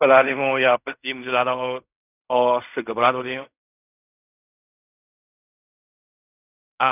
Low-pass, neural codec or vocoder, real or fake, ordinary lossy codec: 3.6 kHz; codec, 16 kHz in and 24 kHz out, 0.4 kbps, LongCat-Audio-Codec, fine tuned four codebook decoder; fake; none